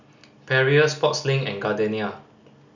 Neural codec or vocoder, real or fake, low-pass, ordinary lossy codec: none; real; 7.2 kHz; none